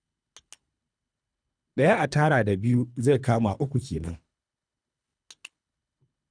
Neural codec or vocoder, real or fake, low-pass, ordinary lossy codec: codec, 24 kHz, 3 kbps, HILCodec; fake; 9.9 kHz; none